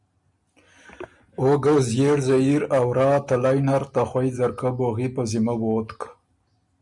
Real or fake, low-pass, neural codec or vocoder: real; 10.8 kHz; none